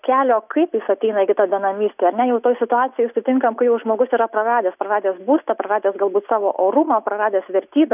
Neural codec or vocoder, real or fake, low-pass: none; real; 3.6 kHz